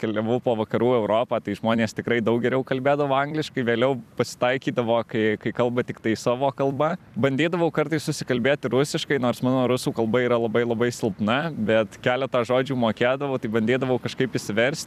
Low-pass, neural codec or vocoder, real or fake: 14.4 kHz; vocoder, 44.1 kHz, 128 mel bands every 256 samples, BigVGAN v2; fake